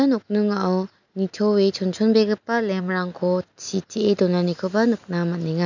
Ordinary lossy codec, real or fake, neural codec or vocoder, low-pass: none; real; none; 7.2 kHz